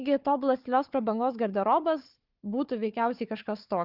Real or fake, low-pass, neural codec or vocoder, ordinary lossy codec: fake; 5.4 kHz; codec, 16 kHz, 4 kbps, FunCodec, trained on Chinese and English, 50 frames a second; Opus, 32 kbps